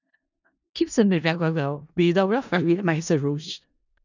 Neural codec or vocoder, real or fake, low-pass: codec, 16 kHz in and 24 kHz out, 0.4 kbps, LongCat-Audio-Codec, four codebook decoder; fake; 7.2 kHz